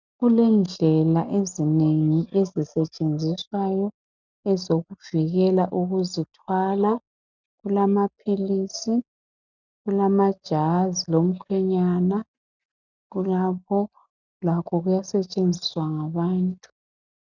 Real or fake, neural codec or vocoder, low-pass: real; none; 7.2 kHz